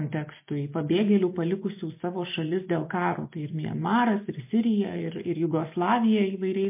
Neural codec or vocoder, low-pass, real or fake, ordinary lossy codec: none; 3.6 kHz; real; MP3, 24 kbps